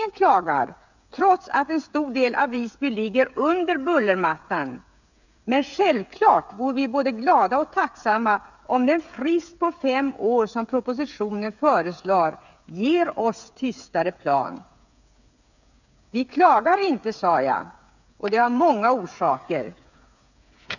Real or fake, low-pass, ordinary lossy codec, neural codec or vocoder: fake; 7.2 kHz; none; codec, 16 kHz, 8 kbps, FreqCodec, smaller model